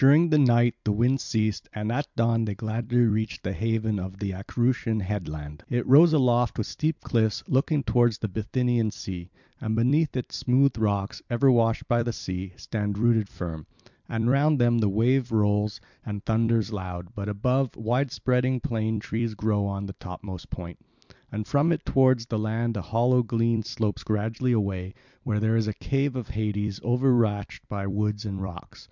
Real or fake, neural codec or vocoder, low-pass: fake; vocoder, 44.1 kHz, 128 mel bands every 256 samples, BigVGAN v2; 7.2 kHz